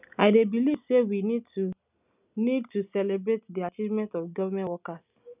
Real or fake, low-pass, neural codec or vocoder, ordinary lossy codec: real; 3.6 kHz; none; none